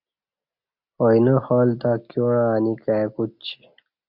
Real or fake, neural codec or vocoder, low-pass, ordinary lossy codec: real; none; 5.4 kHz; MP3, 48 kbps